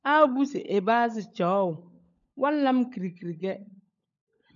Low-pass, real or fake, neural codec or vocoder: 7.2 kHz; fake; codec, 16 kHz, 16 kbps, FunCodec, trained on LibriTTS, 50 frames a second